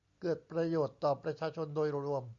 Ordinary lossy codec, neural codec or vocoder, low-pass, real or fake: Opus, 64 kbps; none; 7.2 kHz; real